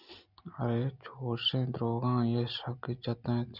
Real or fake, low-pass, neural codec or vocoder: real; 5.4 kHz; none